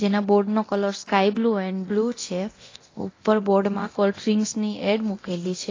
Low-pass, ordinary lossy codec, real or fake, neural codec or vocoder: 7.2 kHz; AAC, 32 kbps; fake; codec, 24 kHz, 0.9 kbps, DualCodec